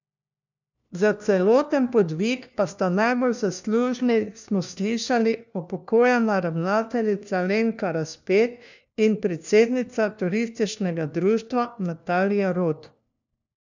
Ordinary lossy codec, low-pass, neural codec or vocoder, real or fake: none; 7.2 kHz; codec, 16 kHz, 1 kbps, FunCodec, trained on LibriTTS, 50 frames a second; fake